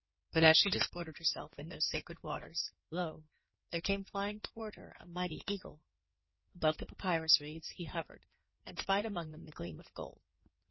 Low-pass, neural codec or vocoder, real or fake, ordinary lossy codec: 7.2 kHz; codec, 16 kHz in and 24 kHz out, 2.2 kbps, FireRedTTS-2 codec; fake; MP3, 24 kbps